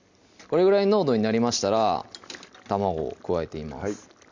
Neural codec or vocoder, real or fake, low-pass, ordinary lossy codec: none; real; 7.2 kHz; Opus, 64 kbps